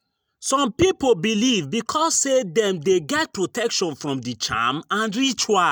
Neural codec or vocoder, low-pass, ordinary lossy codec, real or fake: none; none; none; real